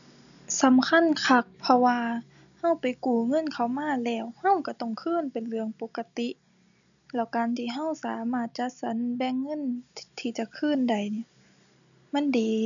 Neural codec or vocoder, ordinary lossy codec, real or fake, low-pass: none; none; real; 7.2 kHz